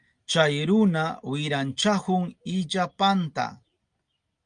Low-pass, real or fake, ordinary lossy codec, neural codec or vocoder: 9.9 kHz; real; Opus, 32 kbps; none